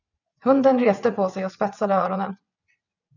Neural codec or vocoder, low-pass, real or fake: vocoder, 22.05 kHz, 80 mel bands, WaveNeXt; 7.2 kHz; fake